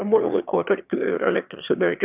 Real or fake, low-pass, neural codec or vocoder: fake; 3.6 kHz; autoencoder, 22.05 kHz, a latent of 192 numbers a frame, VITS, trained on one speaker